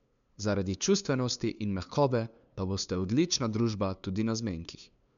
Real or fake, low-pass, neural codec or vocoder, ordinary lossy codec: fake; 7.2 kHz; codec, 16 kHz, 2 kbps, FunCodec, trained on LibriTTS, 25 frames a second; MP3, 96 kbps